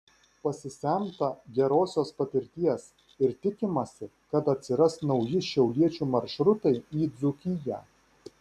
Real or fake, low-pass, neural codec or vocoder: real; 14.4 kHz; none